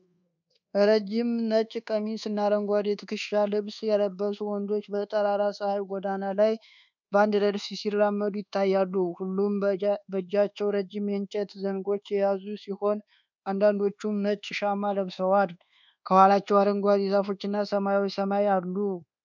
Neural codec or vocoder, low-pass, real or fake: codec, 24 kHz, 1.2 kbps, DualCodec; 7.2 kHz; fake